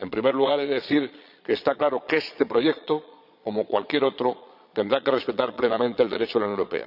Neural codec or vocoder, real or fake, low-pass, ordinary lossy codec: vocoder, 22.05 kHz, 80 mel bands, Vocos; fake; 5.4 kHz; none